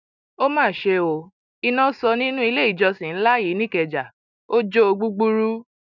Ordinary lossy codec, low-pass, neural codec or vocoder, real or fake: none; 7.2 kHz; none; real